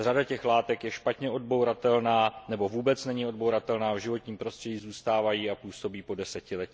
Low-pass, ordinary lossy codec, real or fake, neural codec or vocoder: none; none; real; none